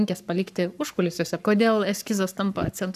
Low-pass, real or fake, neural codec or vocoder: 14.4 kHz; fake; codec, 44.1 kHz, 7.8 kbps, Pupu-Codec